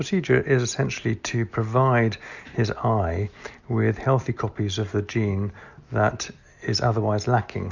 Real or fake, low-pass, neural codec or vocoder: real; 7.2 kHz; none